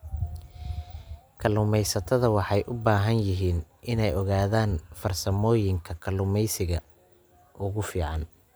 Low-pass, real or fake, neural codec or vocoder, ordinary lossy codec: none; real; none; none